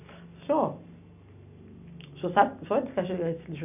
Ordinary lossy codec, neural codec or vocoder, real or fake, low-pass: none; none; real; 3.6 kHz